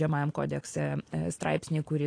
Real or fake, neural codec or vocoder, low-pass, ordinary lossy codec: real; none; 9.9 kHz; AAC, 48 kbps